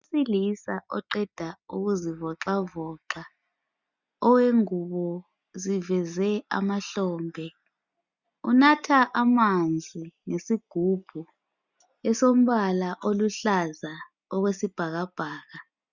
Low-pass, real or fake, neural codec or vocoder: 7.2 kHz; real; none